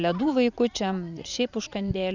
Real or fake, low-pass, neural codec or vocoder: fake; 7.2 kHz; vocoder, 44.1 kHz, 80 mel bands, Vocos